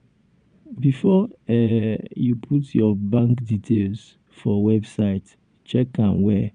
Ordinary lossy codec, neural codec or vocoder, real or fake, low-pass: none; vocoder, 22.05 kHz, 80 mel bands, Vocos; fake; 9.9 kHz